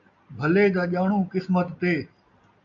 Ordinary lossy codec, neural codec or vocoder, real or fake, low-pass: MP3, 96 kbps; none; real; 7.2 kHz